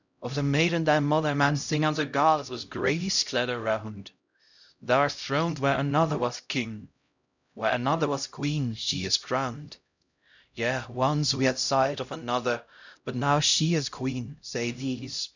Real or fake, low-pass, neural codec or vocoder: fake; 7.2 kHz; codec, 16 kHz, 0.5 kbps, X-Codec, HuBERT features, trained on LibriSpeech